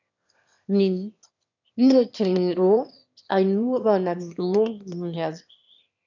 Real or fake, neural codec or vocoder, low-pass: fake; autoencoder, 22.05 kHz, a latent of 192 numbers a frame, VITS, trained on one speaker; 7.2 kHz